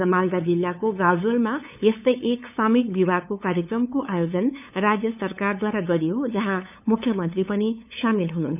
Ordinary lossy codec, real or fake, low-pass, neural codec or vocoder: none; fake; 3.6 kHz; codec, 16 kHz, 8 kbps, FunCodec, trained on LibriTTS, 25 frames a second